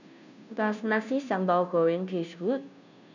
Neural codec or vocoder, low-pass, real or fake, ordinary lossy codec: codec, 16 kHz, 0.5 kbps, FunCodec, trained on Chinese and English, 25 frames a second; 7.2 kHz; fake; none